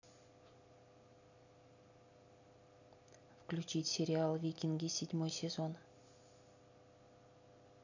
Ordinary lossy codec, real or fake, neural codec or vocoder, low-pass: none; real; none; 7.2 kHz